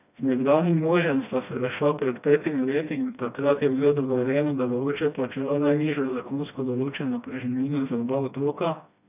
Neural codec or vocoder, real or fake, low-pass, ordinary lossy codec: codec, 16 kHz, 1 kbps, FreqCodec, smaller model; fake; 3.6 kHz; none